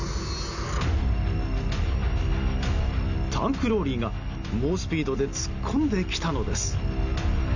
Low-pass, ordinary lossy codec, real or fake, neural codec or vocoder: 7.2 kHz; none; real; none